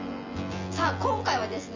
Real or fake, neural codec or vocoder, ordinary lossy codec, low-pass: fake; vocoder, 24 kHz, 100 mel bands, Vocos; MP3, 48 kbps; 7.2 kHz